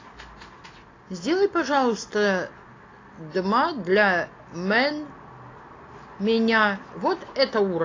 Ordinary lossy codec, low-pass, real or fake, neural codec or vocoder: AAC, 32 kbps; 7.2 kHz; real; none